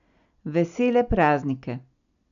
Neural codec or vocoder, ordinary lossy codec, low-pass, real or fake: none; none; 7.2 kHz; real